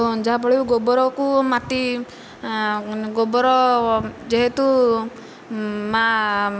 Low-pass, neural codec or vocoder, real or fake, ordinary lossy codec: none; none; real; none